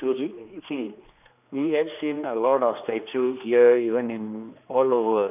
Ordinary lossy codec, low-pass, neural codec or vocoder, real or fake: none; 3.6 kHz; codec, 16 kHz, 2 kbps, X-Codec, HuBERT features, trained on general audio; fake